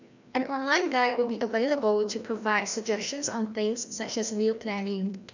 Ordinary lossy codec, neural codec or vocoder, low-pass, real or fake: none; codec, 16 kHz, 1 kbps, FreqCodec, larger model; 7.2 kHz; fake